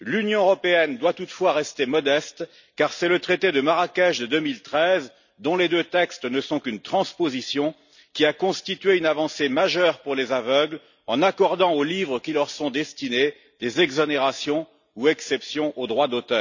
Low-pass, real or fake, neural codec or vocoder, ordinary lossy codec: 7.2 kHz; real; none; none